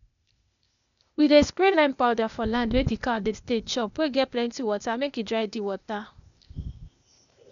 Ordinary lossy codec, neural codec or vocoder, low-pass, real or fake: none; codec, 16 kHz, 0.8 kbps, ZipCodec; 7.2 kHz; fake